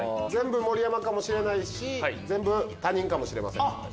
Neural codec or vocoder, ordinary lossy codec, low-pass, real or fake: none; none; none; real